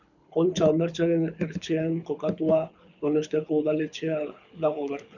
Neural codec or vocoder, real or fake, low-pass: codec, 24 kHz, 6 kbps, HILCodec; fake; 7.2 kHz